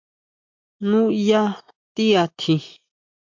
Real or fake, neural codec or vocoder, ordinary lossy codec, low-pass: real; none; MP3, 48 kbps; 7.2 kHz